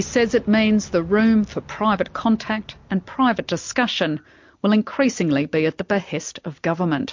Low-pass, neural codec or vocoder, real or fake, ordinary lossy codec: 7.2 kHz; none; real; MP3, 48 kbps